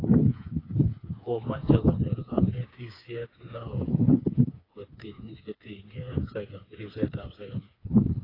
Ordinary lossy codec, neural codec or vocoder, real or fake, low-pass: AAC, 24 kbps; codec, 16 kHz, 4 kbps, FreqCodec, smaller model; fake; 5.4 kHz